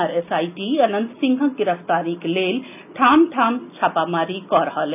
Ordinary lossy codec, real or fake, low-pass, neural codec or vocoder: none; real; 3.6 kHz; none